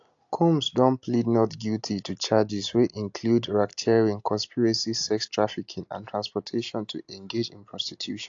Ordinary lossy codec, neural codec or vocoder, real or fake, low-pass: AAC, 48 kbps; none; real; 7.2 kHz